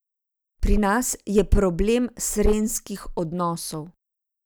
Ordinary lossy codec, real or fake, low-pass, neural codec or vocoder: none; real; none; none